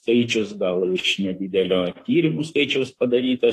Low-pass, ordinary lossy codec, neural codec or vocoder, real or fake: 14.4 kHz; AAC, 48 kbps; autoencoder, 48 kHz, 32 numbers a frame, DAC-VAE, trained on Japanese speech; fake